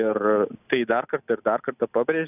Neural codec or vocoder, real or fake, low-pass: none; real; 3.6 kHz